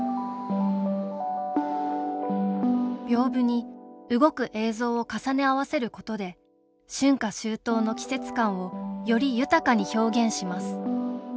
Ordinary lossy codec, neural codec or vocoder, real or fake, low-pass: none; none; real; none